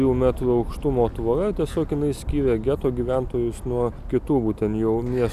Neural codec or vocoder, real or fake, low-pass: none; real; 14.4 kHz